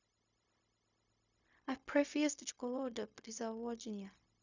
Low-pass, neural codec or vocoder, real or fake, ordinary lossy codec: 7.2 kHz; codec, 16 kHz, 0.4 kbps, LongCat-Audio-Codec; fake; none